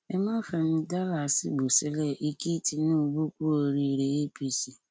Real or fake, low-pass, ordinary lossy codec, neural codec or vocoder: real; none; none; none